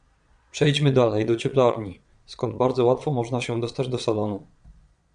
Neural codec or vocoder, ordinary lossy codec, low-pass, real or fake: vocoder, 22.05 kHz, 80 mel bands, Vocos; MP3, 96 kbps; 9.9 kHz; fake